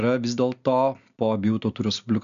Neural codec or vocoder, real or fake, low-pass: none; real; 7.2 kHz